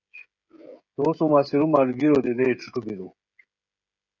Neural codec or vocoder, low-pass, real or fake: codec, 16 kHz, 16 kbps, FreqCodec, smaller model; 7.2 kHz; fake